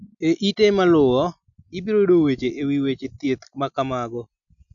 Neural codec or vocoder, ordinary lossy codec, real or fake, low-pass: none; none; real; 7.2 kHz